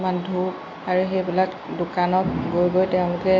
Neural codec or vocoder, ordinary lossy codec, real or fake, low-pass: none; AAC, 32 kbps; real; 7.2 kHz